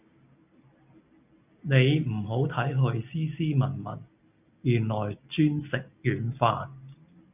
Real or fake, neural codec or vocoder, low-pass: real; none; 3.6 kHz